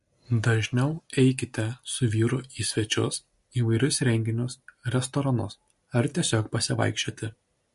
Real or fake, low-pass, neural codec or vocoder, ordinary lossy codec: real; 14.4 kHz; none; MP3, 48 kbps